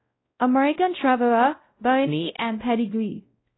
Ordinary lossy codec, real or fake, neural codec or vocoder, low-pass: AAC, 16 kbps; fake; codec, 16 kHz, 0.5 kbps, X-Codec, WavLM features, trained on Multilingual LibriSpeech; 7.2 kHz